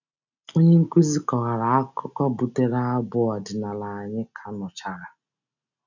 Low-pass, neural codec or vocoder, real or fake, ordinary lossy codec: 7.2 kHz; none; real; none